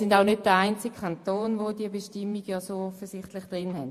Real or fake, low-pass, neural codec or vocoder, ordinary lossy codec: fake; 14.4 kHz; vocoder, 48 kHz, 128 mel bands, Vocos; MP3, 64 kbps